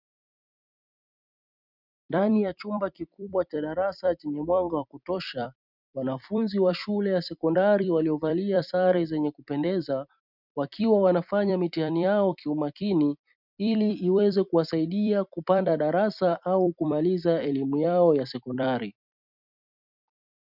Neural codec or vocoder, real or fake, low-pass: vocoder, 44.1 kHz, 128 mel bands every 256 samples, BigVGAN v2; fake; 5.4 kHz